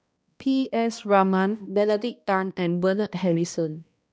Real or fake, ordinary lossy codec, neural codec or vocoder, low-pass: fake; none; codec, 16 kHz, 1 kbps, X-Codec, HuBERT features, trained on balanced general audio; none